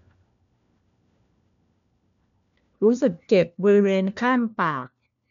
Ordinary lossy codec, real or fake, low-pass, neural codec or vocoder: none; fake; 7.2 kHz; codec, 16 kHz, 1 kbps, FunCodec, trained on LibriTTS, 50 frames a second